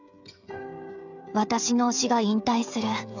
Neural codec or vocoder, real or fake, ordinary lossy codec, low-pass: codec, 16 kHz, 16 kbps, FreqCodec, smaller model; fake; none; 7.2 kHz